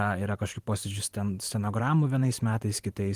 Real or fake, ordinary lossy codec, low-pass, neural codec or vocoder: fake; Opus, 24 kbps; 14.4 kHz; vocoder, 44.1 kHz, 128 mel bands every 512 samples, BigVGAN v2